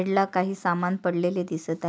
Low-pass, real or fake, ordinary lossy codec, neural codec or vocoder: none; real; none; none